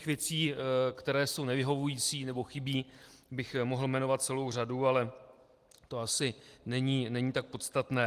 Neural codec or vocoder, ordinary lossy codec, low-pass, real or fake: none; Opus, 24 kbps; 14.4 kHz; real